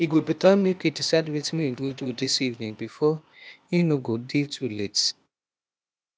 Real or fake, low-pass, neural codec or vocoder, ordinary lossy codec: fake; none; codec, 16 kHz, 0.8 kbps, ZipCodec; none